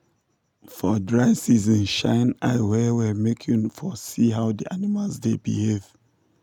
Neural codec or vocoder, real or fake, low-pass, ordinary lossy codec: none; real; 19.8 kHz; none